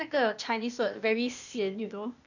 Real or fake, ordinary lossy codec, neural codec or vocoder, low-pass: fake; none; codec, 16 kHz, 0.8 kbps, ZipCodec; 7.2 kHz